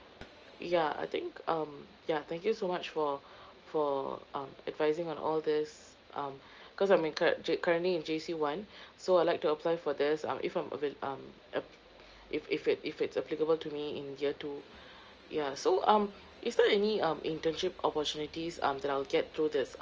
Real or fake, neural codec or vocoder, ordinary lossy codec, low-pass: real; none; Opus, 24 kbps; 7.2 kHz